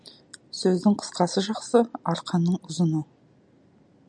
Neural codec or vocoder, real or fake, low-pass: none; real; 10.8 kHz